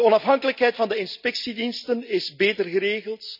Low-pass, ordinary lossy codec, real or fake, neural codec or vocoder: 5.4 kHz; none; real; none